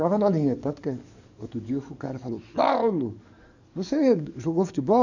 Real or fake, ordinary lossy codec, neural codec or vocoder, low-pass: fake; none; codec, 44.1 kHz, 7.8 kbps, DAC; 7.2 kHz